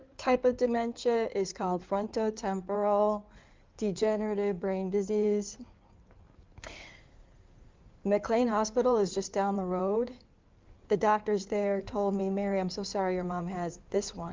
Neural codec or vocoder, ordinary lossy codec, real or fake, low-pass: codec, 16 kHz in and 24 kHz out, 2.2 kbps, FireRedTTS-2 codec; Opus, 32 kbps; fake; 7.2 kHz